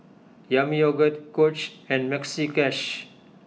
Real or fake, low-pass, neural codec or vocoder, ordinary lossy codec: real; none; none; none